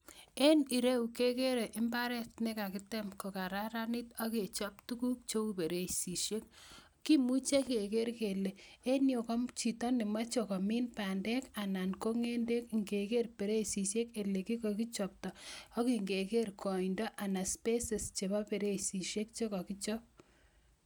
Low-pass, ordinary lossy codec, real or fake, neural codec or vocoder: none; none; real; none